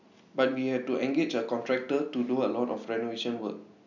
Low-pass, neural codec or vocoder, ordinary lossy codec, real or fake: 7.2 kHz; none; none; real